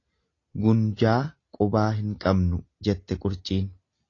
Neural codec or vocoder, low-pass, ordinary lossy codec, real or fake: none; 7.2 kHz; AAC, 32 kbps; real